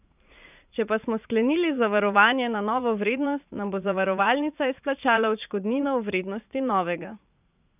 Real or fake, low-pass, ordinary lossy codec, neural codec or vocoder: fake; 3.6 kHz; none; vocoder, 44.1 kHz, 80 mel bands, Vocos